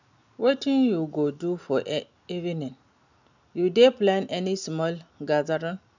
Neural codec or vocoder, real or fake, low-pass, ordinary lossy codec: none; real; 7.2 kHz; none